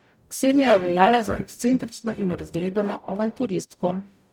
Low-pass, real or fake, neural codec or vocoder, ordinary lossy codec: 19.8 kHz; fake; codec, 44.1 kHz, 0.9 kbps, DAC; none